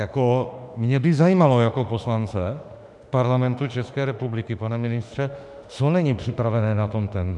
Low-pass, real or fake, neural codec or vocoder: 10.8 kHz; fake; autoencoder, 48 kHz, 32 numbers a frame, DAC-VAE, trained on Japanese speech